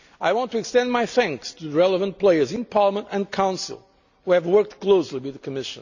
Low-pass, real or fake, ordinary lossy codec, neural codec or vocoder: 7.2 kHz; real; none; none